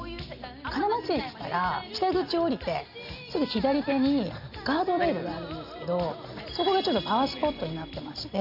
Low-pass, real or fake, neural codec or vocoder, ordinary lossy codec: 5.4 kHz; real; none; none